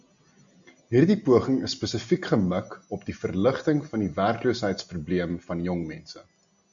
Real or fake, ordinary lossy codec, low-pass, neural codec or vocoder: real; AAC, 64 kbps; 7.2 kHz; none